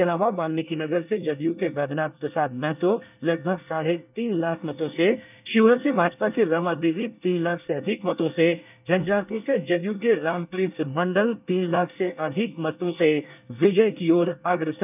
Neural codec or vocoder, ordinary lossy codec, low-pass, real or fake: codec, 24 kHz, 1 kbps, SNAC; none; 3.6 kHz; fake